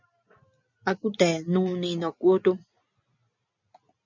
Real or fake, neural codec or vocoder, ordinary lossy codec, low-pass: real; none; MP3, 64 kbps; 7.2 kHz